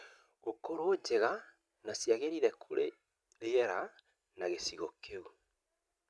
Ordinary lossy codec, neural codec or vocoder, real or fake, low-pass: none; none; real; none